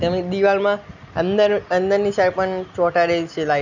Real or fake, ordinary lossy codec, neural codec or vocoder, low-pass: real; none; none; 7.2 kHz